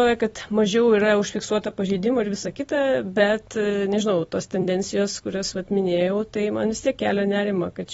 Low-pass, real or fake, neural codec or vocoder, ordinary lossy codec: 19.8 kHz; fake; vocoder, 44.1 kHz, 128 mel bands every 256 samples, BigVGAN v2; AAC, 24 kbps